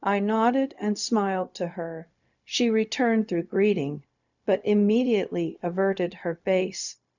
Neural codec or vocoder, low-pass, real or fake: codec, 16 kHz, 0.4 kbps, LongCat-Audio-Codec; 7.2 kHz; fake